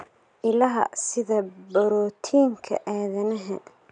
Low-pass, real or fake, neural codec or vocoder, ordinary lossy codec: 9.9 kHz; real; none; none